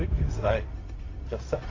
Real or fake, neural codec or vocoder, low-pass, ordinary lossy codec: fake; codec, 16 kHz, 0.4 kbps, LongCat-Audio-Codec; 7.2 kHz; AAC, 32 kbps